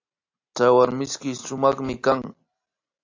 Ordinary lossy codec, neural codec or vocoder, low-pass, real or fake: AAC, 48 kbps; none; 7.2 kHz; real